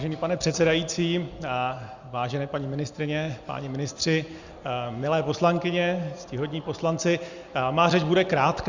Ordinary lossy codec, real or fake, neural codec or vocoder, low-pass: Opus, 64 kbps; real; none; 7.2 kHz